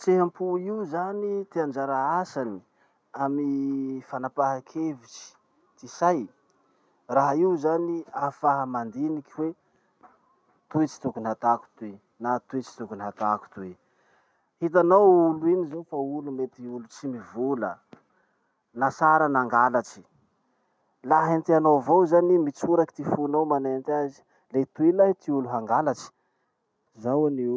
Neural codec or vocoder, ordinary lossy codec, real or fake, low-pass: none; none; real; none